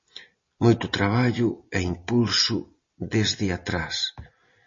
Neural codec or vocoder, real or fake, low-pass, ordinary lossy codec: none; real; 7.2 kHz; MP3, 32 kbps